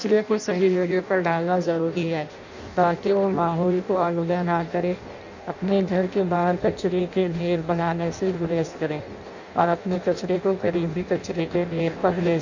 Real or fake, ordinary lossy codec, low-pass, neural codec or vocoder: fake; none; 7.2 kHz; codec, 16 kHz in and 24 kHz out, 0.6 kbps, FireRedTTS-2 codec